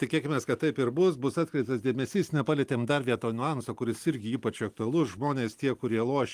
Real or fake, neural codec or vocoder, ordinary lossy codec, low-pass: real; none; Opus, 32 kbps; 14.4 kHz